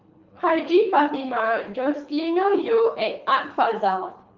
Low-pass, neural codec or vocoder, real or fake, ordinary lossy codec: 7.2 kHz; codec, 24 kHz, 3 kbps, HILCodec; fake; Opus, 24 kbps